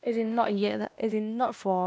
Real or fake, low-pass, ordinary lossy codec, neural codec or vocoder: fake; none; none; codec, 16 kHz, 1 kbps, X-Codec, WavLM features, trained on Multilingual LibriSpeech